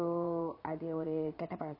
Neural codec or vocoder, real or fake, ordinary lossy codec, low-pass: vocoder, 22.05 kHz, 80 mel bands, Vocos; fake; MP3, 32 kbps; 5.4 kHz